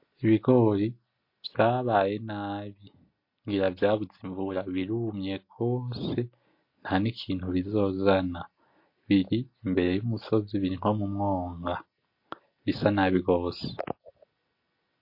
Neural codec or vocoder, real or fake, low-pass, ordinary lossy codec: none; real; 5.4 kHz; MP3, 32 kbps